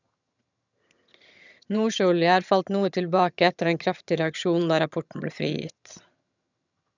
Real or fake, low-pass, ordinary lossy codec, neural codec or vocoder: fake; 7.2 kHz; none; vocoder, 22.05 kHz, 80 mel bands, HiFi-GAN